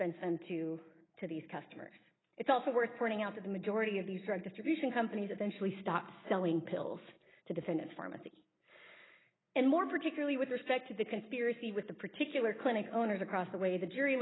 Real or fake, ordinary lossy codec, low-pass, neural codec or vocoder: real; AAC, 16 kbps; 7.2 kHz; none